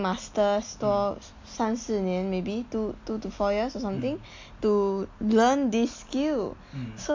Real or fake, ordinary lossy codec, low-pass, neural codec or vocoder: real; MP3, 48 kbps; 7.2 kHz; none